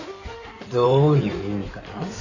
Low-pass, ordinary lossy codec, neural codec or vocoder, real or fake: 7.2 kHz; none; codec, 16 kHz in and 24 kHz out, 2.2 kbps, FireRedTTS-2 codec; fake